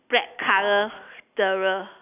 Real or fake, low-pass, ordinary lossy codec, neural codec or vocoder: real; 3.6 kHz; none; none